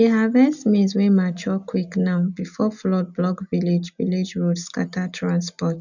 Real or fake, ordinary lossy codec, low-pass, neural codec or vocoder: real; none; 7.2 kHz; none